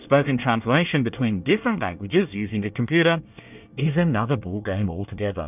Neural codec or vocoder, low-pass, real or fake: codec, 24 kHz, 1 kbps, SNAC; 3.6 kHz; fake